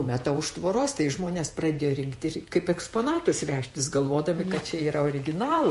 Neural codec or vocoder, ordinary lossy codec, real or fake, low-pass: vocoder, 48 kHz, 128 mel bands, Vocos; MP3, 48 kbps; fake; 14.4 kHz